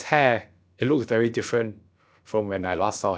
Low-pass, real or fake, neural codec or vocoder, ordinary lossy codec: none; fake; codec, 16 kHz, about 1 kbps, DyCAST, with the encoder's durations; none